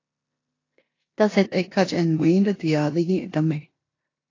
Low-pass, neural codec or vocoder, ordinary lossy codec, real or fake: 7.2 kHz; codec, 16 kHz in and 24 kHz out, 0.9 kbps, LongCat-Audio-Codec, four codebook decoder; AAC, 32 kbps; fake